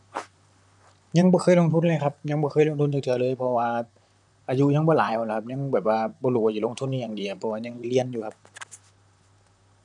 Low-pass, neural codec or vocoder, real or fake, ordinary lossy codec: 10.8 kHz; vocoder, 44.1 kHz, 128 mel bands, Pupu-Vocoder; fake; none